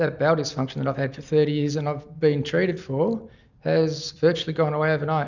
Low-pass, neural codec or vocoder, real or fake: 7.2 kHz; none; real